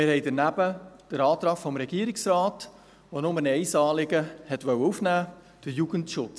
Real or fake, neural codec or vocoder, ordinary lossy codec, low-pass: real; none; none; none